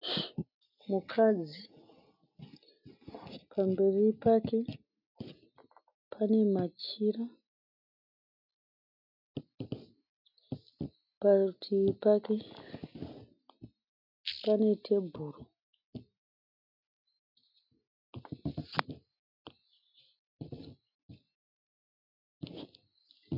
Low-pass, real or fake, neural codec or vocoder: 5.4 kHz; real; none